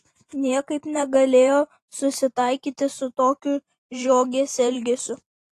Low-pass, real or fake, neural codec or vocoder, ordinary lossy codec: 14.4 kHz; fake; vocoder, 44.1 kHz, 128 mel bands every 512 samples, BigVGAN v2; AAC, 48 kbps